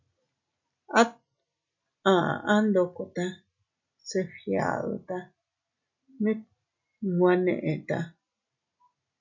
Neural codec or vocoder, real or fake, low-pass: none; real; 7.2 kHz